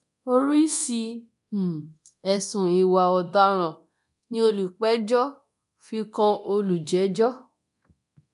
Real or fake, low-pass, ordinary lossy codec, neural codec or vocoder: fake; 10.8 kHz; none; codec, 24 kHz, 0.9 kbps, DualCodec